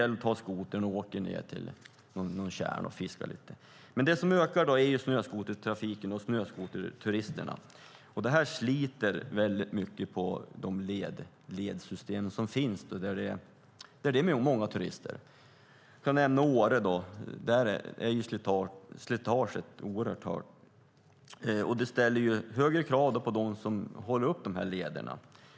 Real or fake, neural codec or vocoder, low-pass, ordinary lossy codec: real; none; none; none